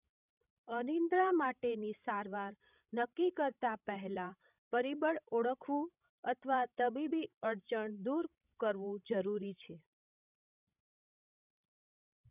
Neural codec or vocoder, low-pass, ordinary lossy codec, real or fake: codec, 16 kHz, 16 kbps, FreqCodec, larger model; 3.6 kHz; none; fake